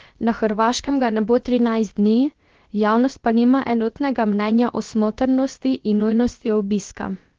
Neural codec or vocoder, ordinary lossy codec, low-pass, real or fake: codec, 16 kHz, about 1 kbps, DyCAST, with the encoder's durations; Opus, 16 kbps; 7.2 kHz; fake